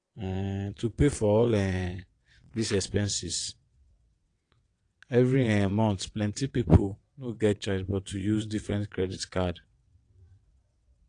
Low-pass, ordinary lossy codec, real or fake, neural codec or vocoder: 9.9 kHz; AAC, 48 kbps; fake; vocoder, 22.05 kHz, 80 mel bands, WaveNeXt